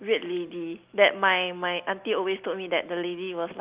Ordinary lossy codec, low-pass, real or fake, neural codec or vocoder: Opus, 24 kbps; 3.6 kHz; real; none